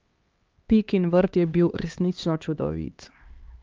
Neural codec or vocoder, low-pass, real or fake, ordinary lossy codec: codec, 16 kHz, 2 kbps, X-Codec, HuBERT features, trained on LibriSpeech; 7.2 kHz; fake; Opus, 24 kbps